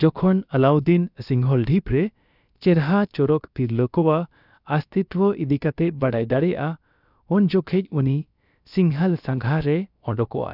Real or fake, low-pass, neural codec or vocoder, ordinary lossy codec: fake; 5.4 kHz; codec, 16 kHz, about 1 kbps, DyCAST, with the encoder's durations; none